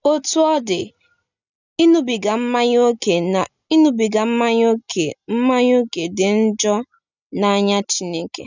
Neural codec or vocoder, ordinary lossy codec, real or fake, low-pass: none; none; real; 7.2 kHz